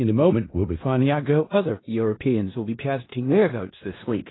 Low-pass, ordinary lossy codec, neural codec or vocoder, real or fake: 7.2 kHz; AAC, 16 kbps; codec, 16 kHz in and 24 kHz out, 0.4 kbps, LongCat-Audio-Codec, four codebook decoder; fake